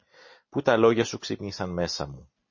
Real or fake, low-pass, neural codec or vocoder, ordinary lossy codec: real; 7.2 kHz; none; MP3, 32 kbps